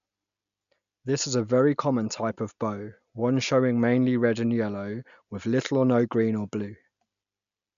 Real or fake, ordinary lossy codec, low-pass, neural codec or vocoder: real; none; 7.2 kHz; none